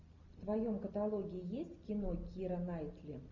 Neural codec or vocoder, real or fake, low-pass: none; real; 7.2 kHz